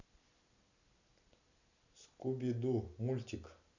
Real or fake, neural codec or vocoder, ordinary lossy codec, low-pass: real; none; none; 7.2 kHz